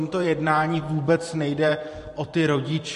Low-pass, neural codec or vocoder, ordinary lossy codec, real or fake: 14.4 kHz; vocoder, 44.1 kHz, 128 mel bands every 512 samples, BigVGAN v2; MP3, 48 kbps; fake